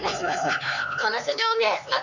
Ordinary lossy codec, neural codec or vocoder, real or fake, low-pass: none; codec, 16 kHz, 4 kbps, X-Codec, HuBERT features, trained on LibriSpeech; fake; 7.2 kHz